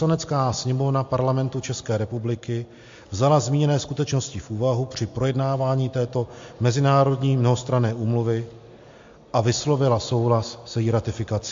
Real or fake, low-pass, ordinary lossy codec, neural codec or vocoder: real; 7.2 kHz; MP3, 48 kbps; none